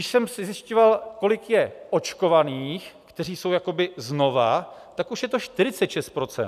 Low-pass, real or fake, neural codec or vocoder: 14.4 kHz; real; none